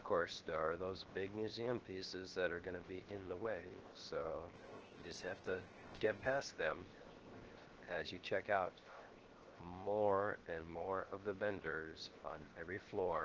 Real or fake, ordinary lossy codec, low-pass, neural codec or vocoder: fake; Opus, 16 kbps; 7.2 kHz; codec, 16 kHz, 0.7 kbps, FocalCodec